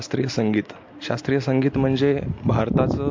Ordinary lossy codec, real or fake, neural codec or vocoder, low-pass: MP3, 48 kbps; real; none; 7.2 kHz